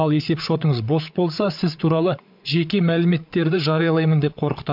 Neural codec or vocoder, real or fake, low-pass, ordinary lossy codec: codec, 16 kHz, 8 kbps, FreqCodec, larger model; fake; 5.4 kHz; MP3, 48 kbps